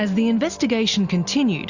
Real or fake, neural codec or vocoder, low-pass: real; none; 7.2 kHz